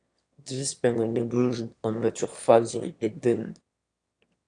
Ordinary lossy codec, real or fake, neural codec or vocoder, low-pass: AAC, 64 kbps; fake; autoencoder, 22.05 kHz, a latent of 192 numbers a frame, VITS, trained on one speaker; 9.9 kHz